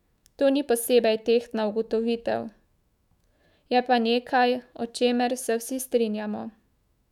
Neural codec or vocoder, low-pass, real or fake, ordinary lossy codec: autoencoder, 48 kHz, 128 numbers a frame, DAC-VAE, trained on Japanese speech; 19.8 kHz; fake; none